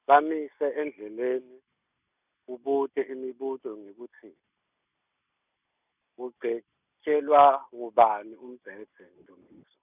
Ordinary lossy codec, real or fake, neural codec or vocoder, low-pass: none; real; none; 3.6 kHz